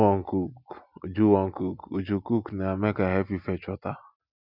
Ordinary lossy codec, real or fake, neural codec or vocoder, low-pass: none; real; none; 5.4 kHz